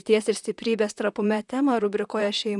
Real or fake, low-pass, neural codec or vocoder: fake; 10.8 kHz; vocoder, 44.1 kHz, 128 mel bands, Pupu-Vocoder